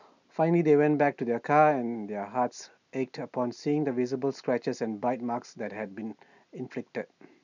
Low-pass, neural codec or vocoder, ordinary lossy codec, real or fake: 7.2 kHz; none; none; real